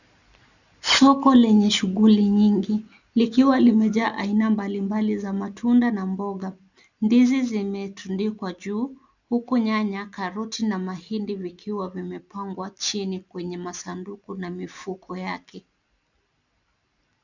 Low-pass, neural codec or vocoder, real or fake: 7.2 kHz; none; real